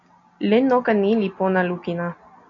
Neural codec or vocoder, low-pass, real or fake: none; 7.2 kHz; real